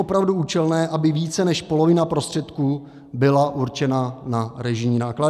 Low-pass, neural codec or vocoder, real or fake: 14.4 kHz; none; real